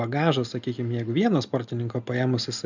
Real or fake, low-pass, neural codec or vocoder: real; 7.2 kHz; none